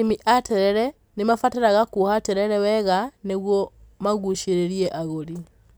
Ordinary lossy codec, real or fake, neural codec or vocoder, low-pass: none; real; none; none